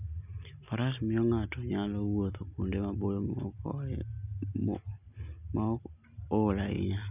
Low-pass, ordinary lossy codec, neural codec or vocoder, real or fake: 3.6 kHz; none; none; real